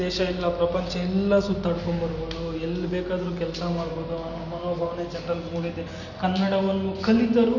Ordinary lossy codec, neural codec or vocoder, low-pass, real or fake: none; none; 7.2 kHz; real